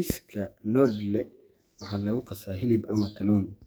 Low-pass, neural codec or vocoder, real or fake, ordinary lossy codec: none; codec, 44.1 kHz, 2.6 kbps, SNAC; fake; none